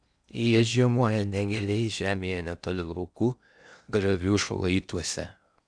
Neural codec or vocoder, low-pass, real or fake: codec, 16 kHz in and 24 kHz out, 0.6 kbps, FocalCodec, streaming, 2048 codes; 9.9 kHz; fake